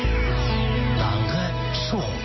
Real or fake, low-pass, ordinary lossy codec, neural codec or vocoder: real; 7.2 kHz; MP3, 24 kbps; none